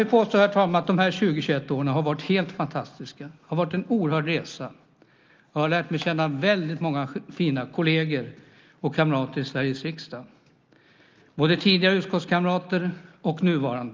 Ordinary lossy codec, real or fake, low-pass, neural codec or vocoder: Opus, 32 kbps; real; 7.2 kHz; none